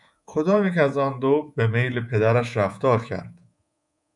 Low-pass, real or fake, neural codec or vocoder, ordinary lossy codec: 10.8 kHz; fake; codec, 24 kHz, 3.1 kbps, DualCodec; MP3, 96 kbps